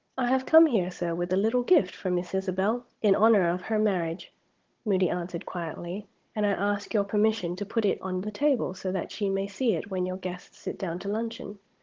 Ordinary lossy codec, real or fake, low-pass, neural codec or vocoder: Opus, 16 kbps; fake; 7.2 kHz; codec, 16 kHz, 16 kbps, FunCodec, trained on Chinese and English, 50 frames a second